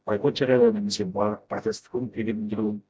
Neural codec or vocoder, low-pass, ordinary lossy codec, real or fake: codec, 16 kHz, 0.5 kbps, FreqCodec, smaller model; none; none; fake